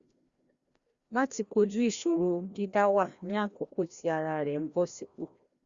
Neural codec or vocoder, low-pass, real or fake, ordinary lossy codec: codec, 16 kHz, 1 kbps, FreqCodec, larger model; 7.2 kHz; fake; Opus, 64 kbps